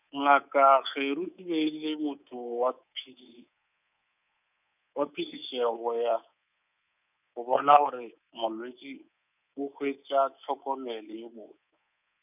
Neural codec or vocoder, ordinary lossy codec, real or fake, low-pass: codec, 24 kHz, 3.1 kbps, DualCodec; none; fake; 3.6 kHz